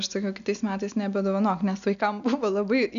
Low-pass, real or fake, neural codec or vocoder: 7.2 kHz; real; none